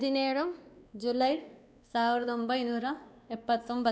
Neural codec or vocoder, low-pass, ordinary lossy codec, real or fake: codec, 16 kHz, 2 kbps, X-Codec, WavLM features, trained on Multilingual LibriSpeech; none; none; fake